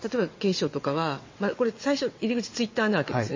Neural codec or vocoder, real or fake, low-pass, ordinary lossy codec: vocoder, 22.05 kHz, 80 mel bands, WaveNeXt; fake; 7.2 kHz; MP3, 32 kbps